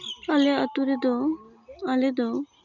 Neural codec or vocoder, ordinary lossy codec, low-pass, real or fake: none; Opus, 64 kbps; 7.2 kHz; real